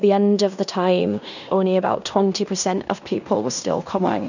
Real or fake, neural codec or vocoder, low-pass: fake; codec, 16 kHz in and 24 kHz out, 0.9 kbps, LongCat-Audio-Codec, fine tuned four codebook decoder; 7.2 kHz